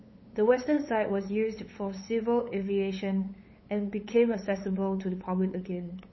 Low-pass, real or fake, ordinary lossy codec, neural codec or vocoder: 7.2 kHz; fake; MP3, 24 kbps; codec, 16 kHz, 8 kbps, FunCodec, trained on LibriTTS, 25 frames a second